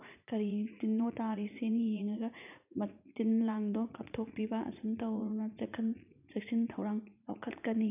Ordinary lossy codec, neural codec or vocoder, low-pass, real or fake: none; vocoder, 44.1 kHz, 80 mel bands, Vocos; 3.6 kHz; fake